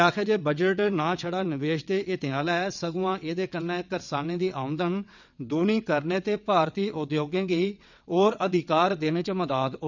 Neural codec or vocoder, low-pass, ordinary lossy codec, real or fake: vocoder, 22.05 kHz, 80 mel bands, WaveNeXt; 7.2 kHz; none; fake